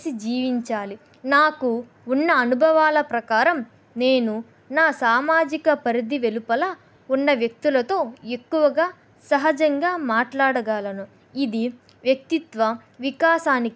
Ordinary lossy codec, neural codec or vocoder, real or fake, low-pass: none; none; real; none